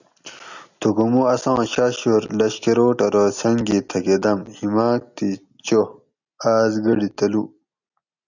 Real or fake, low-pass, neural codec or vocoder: real; 7.2 kHz; none